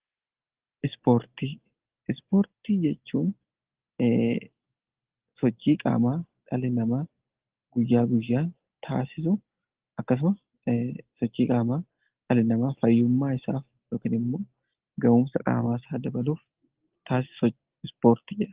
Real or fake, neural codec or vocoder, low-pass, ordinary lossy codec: real; none; 3.6 kHz; Opus, 16 kbps